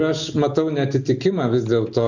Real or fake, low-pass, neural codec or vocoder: real; 7.2 kHz; none